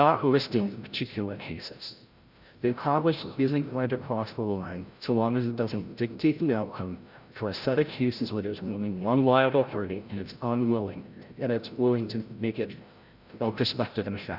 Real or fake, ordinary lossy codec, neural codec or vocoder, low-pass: fake; Opus, 64 kbps; codec, 16 kHz, 0.5 kbps, FreqCodec, larger model; 5.4 kHz